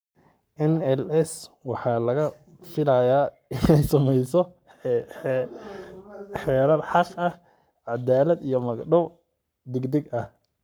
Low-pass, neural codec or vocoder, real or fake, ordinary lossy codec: none; codec, 44.1 kHz, 7.8 kbps, Pupu-Codec; fake; none